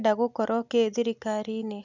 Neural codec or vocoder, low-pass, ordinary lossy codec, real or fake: none; 7.2 kHz; none; real